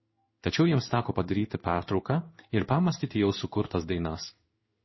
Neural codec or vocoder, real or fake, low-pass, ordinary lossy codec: codec, 16 kHz in and 24 kHz out, 1 kbps, XY-Tokenizer; fake; 7.2 kHz; MP3, 24 kbps